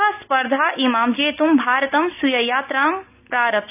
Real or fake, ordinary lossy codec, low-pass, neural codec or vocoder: real; none; 3.6 kHz; none